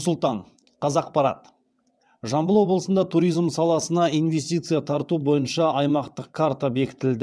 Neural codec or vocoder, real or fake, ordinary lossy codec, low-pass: vocoder, 22.05 kHz, 80 mel bands, WaveNeXt; fake; none; none